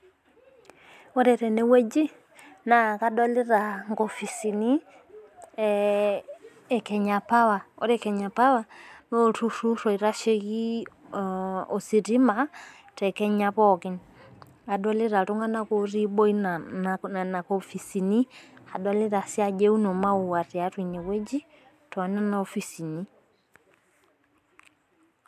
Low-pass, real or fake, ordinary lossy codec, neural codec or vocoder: 14.4 kHz; real; AAC, 96 kbps; none